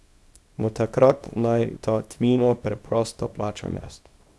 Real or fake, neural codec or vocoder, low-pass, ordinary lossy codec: fake; codec, 24 kHz, 0.9 kbps, WavTokenizer, small release; none; none